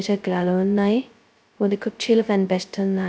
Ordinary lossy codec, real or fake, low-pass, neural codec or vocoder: none; fake; none; codec, 16 kHz, 0.2 kbps, FocalCodec